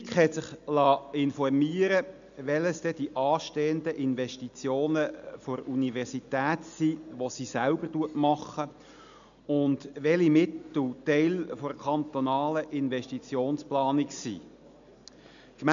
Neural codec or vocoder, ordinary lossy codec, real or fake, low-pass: none; none; real; 7.2 kHz